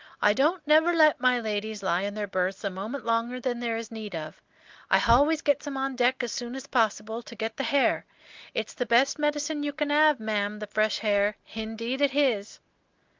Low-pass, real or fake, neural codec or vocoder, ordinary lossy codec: 7.2 kHz; real; none; Opus, 32 kbps